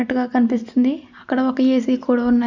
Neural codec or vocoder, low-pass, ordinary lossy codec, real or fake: none; 7.2 kHz; none; real